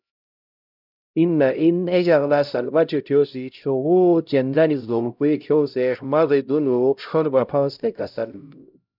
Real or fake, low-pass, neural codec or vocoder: fake; 5.4 kHz; codec, 16 kHz, 0.5 kbps, X-Codec, HuBERT features, trained on LibriSpeech